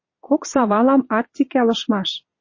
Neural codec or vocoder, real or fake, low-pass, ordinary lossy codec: vocoder, 22.05 kHz, 80 mel bands, Vocos; fake; 7.2 kHz; MP3, 32 kbps